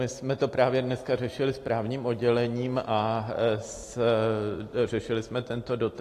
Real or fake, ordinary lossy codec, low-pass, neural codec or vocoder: real; AAC, 48 kbps; 14.4 kHz; none